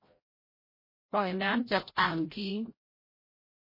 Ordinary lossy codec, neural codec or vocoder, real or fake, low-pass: MP3, 24 kbps; codec, 16 kHz, 0.5 kbps, FreqCodec, larger model; fake; 5.4 kHz